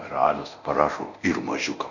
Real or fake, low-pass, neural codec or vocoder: fake; 7.2 kHz; codec, 24 kHz, 0.9 kbps, DualCodec